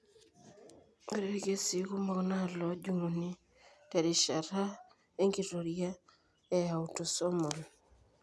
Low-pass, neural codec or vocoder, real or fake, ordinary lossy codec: none; none; real; none